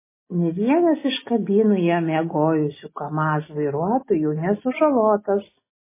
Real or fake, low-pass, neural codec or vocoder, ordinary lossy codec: real; 3.6 kHz; none; MP3, 16 kbps